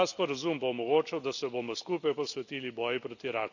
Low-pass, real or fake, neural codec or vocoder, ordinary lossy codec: 7.2 kHz; real; none; none